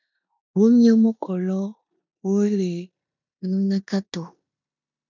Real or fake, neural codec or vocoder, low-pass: fake; codec, 16 kHz in and 24 kHz out, 0.9 kbps, LongCat-Audio-Codec, four codebook decoder; 7.2 kHz